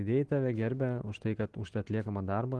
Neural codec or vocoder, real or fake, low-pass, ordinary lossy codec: none; real; 10.8 kHz; Opus, 16 kbps